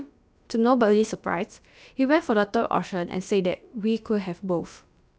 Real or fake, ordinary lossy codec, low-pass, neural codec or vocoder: fake; none; none; codec, 16 kHz, about 1 kbps, DyCAST, with the encoder's durations